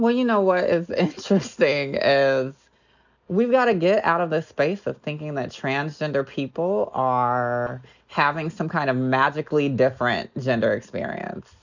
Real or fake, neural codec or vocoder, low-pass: real; none; 7.2 kHz